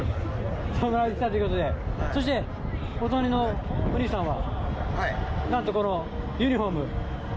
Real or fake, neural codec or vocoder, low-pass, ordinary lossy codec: real; none; none; none